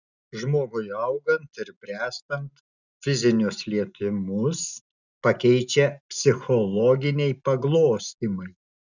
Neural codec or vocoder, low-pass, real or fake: none; 7.2 kHz; real